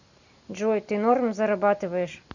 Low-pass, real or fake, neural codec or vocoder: 7.2 kHz; real; none